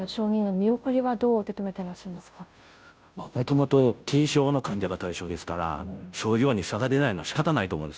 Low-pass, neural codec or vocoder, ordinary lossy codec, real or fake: none; codec, 16 kHz, 0.5 kbps, FunCodec, trained on Chinese and English, 25 frames a second; none; fake